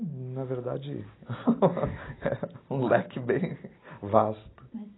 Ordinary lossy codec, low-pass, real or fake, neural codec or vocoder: AAC, 16 kbps; 7.2 kHz; real; none